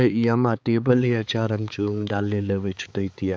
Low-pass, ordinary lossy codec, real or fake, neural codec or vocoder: none; none; fake; codec, 16 kHz, 4 kbps, X-Codec, HuBERT features, trained on balanced general audio